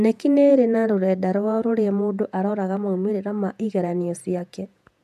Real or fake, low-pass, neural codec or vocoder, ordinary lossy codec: fake; 14.4 kHz; vocoder, 48 kHz, 128 mel bands, Vocos; none